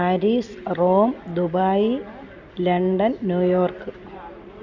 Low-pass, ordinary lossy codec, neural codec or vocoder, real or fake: 7.2 kHz; none; none; real